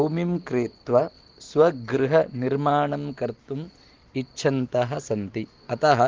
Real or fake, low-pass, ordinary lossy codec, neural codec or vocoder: real; 7.2 kHz; Opus, 16 kbps; none